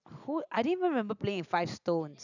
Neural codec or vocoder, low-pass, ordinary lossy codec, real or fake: none; 7.2 kHz; none; real